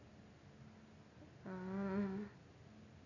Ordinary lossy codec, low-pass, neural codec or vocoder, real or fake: none; 7.2 kHz; none; real